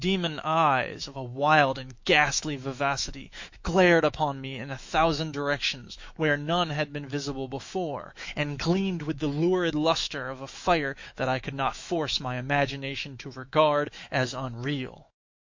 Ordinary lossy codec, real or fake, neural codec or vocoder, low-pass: MP3, 48 kbps; fake; autoencoder, 48 kHz, 128 numbers a frame, DAC-VAE, trained on Japanese speech; 7.2 kHz